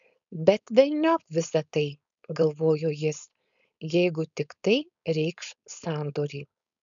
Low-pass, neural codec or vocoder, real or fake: 7.2 kHz; codec, 16 kHz, 4.8 kbps, FACodec; fake